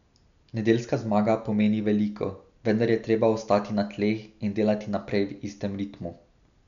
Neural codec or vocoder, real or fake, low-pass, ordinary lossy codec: none; real; 7.2 kHz; none